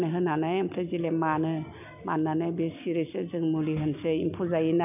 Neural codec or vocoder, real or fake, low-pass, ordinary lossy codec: none; real; 3.6 kHz; none